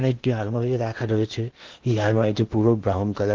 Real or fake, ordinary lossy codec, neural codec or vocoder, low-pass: fake; Opus, 16 kbps; codec, 16 kHz in and 24 kHz out, 0.8 kbps, FocalCodec, streaming, 65536 codes; 7.2 kHz